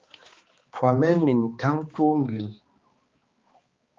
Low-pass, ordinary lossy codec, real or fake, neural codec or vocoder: 7.2 kHz; Opus, 32 kbps; fake; codec, 16 kHz, 2 kbps, X-Codec, HuBERT features, trained on balanced general audio